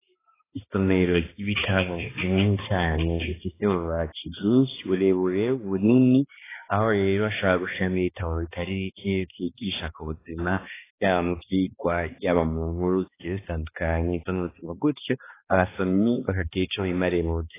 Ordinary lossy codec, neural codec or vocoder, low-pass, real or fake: AAC, 16 kbps; codec, 16 kHz, 2 kbps, X-Codec, HuBERT features, trained on balanced general audio; 3.6 kHz; fake